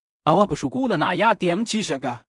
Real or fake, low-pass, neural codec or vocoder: fake; 10.8 kHz; codec, 16 kHz in and 24 kHz out, 0.4 kbps, LongCat-Audio-Codec, two codebook decoder